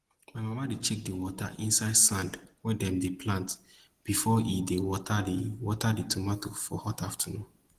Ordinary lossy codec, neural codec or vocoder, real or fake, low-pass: Opus, 16 kbps; none; real; 14.4 kHz